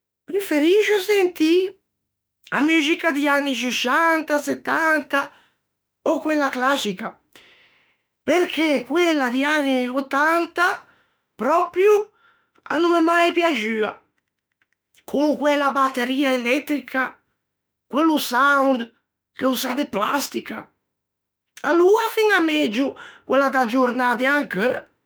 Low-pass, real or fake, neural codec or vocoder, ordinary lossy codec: none; fake; autoencoder, 48 kHz, 32 numbers a frame, DAC-VAE, trained on Japanese speech; none